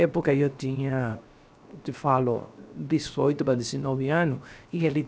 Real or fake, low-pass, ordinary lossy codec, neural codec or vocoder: fake; none; none; codec, 16 kHz, 0.7 kbps, FocalCodec